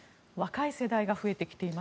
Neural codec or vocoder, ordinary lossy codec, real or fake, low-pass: none; none; real; none